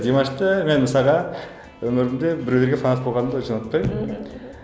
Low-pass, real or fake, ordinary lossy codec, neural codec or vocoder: none; real; none; none